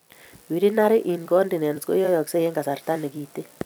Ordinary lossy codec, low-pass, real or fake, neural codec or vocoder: none; none; fake; vocoder, 44.1 kHz, 128 mel bands every 256 samples, BigVGAN v2